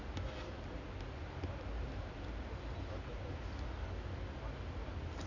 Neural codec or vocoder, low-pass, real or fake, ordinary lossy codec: none; 7.2 kHz; real; none